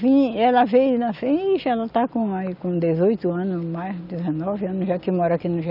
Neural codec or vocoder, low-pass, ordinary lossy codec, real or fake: none; 5.4 kHz; none; real